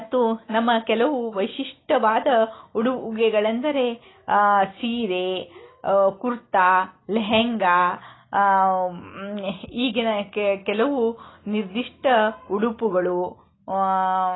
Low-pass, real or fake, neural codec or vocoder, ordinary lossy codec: 7.2 kHz; real; none; AAC, 16 kbps